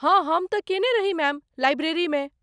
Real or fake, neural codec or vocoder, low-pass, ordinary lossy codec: real; none; 9.9 kHz; none